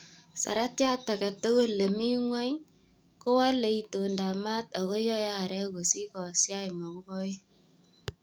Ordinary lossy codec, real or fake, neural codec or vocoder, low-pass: none; fake; codec, 44.1 kHz, 7.8 kbps, DAC; none